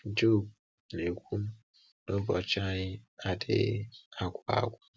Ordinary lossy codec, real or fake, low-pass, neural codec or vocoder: none; real; none; none